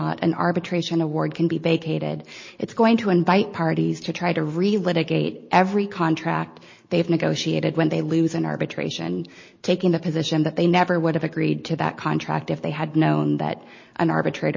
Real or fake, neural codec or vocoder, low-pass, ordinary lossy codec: real; none; 7.2 kHz; MP3, 32 kbps